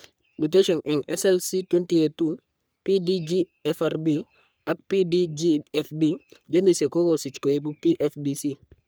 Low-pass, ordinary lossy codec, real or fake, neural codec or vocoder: none; none; fake; codec, 44.1 kHz, 3.4 kbps, Pupu-Codec